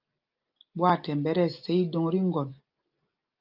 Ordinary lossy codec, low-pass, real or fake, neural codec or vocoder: Opus, 24 kbps; 5.4 kHz; real; none